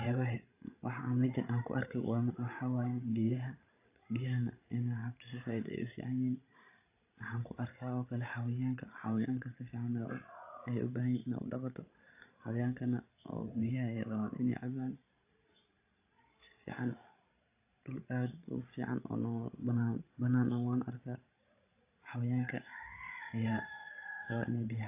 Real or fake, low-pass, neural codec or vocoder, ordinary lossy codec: fake; 3.6 kHz; codec, 16 kHz, 16 kbps, FreqCodec, larger model; AAC, 24 kbps